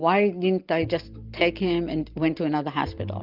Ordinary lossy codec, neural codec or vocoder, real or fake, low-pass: Opus, 32 kbps; none; real; 5.4 kHz